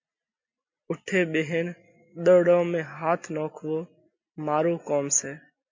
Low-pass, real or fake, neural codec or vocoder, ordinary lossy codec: 7.2 kHz; real; none; MP3, 48 kbps